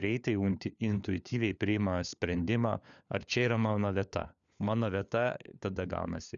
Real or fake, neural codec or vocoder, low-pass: fake; codec, 16 kHz, 4 kbps, FunCodec, trained on LibriTTS, 50 frames a second; 7.2 kHz